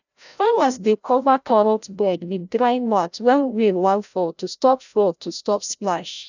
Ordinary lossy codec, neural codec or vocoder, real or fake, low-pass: none; codec, 16 kHz, 0.5 kbps, FreqCodec, larger model; fake; 7.2 kHz